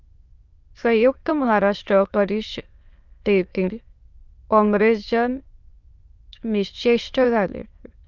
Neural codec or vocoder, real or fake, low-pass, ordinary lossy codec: autoencoder, 22.05 kHz, a latent of 192 numbers a frame, VITS, trained on many speakers; fake; 7.2 kHz; Opus, 24 kbps